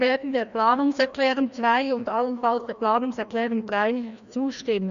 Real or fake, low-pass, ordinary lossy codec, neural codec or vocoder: fake; 7.2 kHz; none; codec, 16 kHz, 1 kbps, FreqCodec, larger model